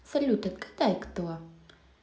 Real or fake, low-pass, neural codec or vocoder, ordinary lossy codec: real; none; none; none